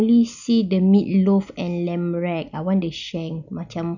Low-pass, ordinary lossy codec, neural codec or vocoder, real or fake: 7.2 kHz; none; none; real